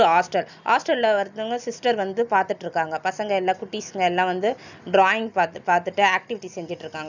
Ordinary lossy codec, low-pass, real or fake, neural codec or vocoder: none; 7.2 kHz; real; none